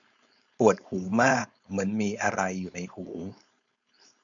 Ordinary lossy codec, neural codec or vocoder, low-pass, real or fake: none; codec, 16 kHz, 4.8 kbps, FACodec; 7.2 kHz; fake